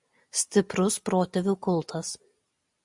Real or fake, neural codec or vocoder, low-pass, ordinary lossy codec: real; none; 10.8 kHz; MP3, 96 kbps